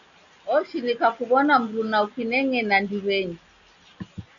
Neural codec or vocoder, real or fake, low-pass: none; real; 7.2 kHz